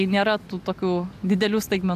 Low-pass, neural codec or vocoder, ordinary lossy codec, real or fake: 14.4 kHz; none; AAC, 96 kbps; real